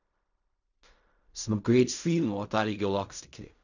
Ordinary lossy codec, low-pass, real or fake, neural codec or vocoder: none; 7.2 kHz; fake; codec, 16 kHz in and 24 kHz out, 0.4 kbps, LongCat-Audio-Codec, fine tuned four codebook decoder